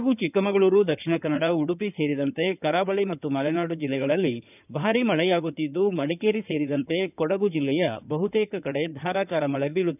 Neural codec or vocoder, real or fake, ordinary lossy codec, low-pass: codec, 16 kHz in and 24 kHz out, 2.2 kbps, FireRedTTS-2 codec; fake; none; 3.6 kHz